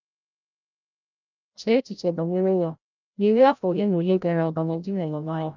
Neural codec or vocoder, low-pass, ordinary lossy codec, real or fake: codec, 16 kHz, 0.5 kbps, FreqCodec, larger model; 7.2 kHz; none; fake